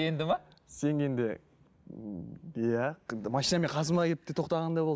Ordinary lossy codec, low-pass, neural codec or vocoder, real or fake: none; none; none; real